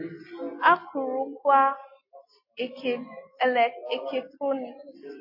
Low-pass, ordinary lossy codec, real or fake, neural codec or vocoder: 5.4 kHz; MP3, 24 kbps; real; none